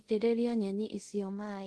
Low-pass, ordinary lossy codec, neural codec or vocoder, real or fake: 10.8 kHz; Opus, 16 kbps; codec, 24 kHz, 0.5 kbps, DualCodec; fake